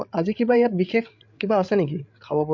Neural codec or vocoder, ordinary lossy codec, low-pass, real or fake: codec, 16 kHz, 8 kbps, FreqCodec, larger model; MP3, 48 kbps; 7.2 kHz; fake